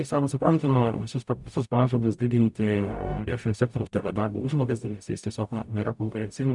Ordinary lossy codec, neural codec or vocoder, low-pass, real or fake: MP3, 96 kbps; codec, 44.1 kHz, 0.9 kbps, DAC; 10.8 kHz; fake